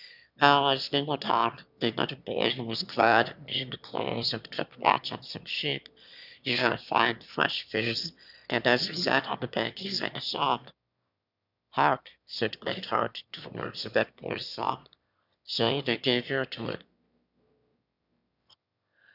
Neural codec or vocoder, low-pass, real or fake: autoencoder, 22.05 kHz, a latent of 192 numbers a frame, VITS, trained on one speaker; 5.4 kHz; fake